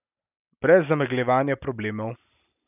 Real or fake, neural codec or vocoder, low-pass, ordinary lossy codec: real; none; 3.6 kHz; none